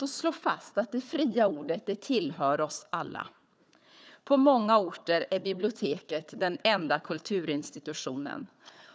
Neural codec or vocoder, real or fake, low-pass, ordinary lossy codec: codec, 16 kHz, 4 kbps, FunCodec, trained on Chinese and English, 50 frames a second; fake; none; none